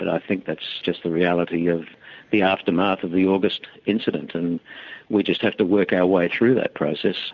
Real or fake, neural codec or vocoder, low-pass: real; none; 7.2 kHz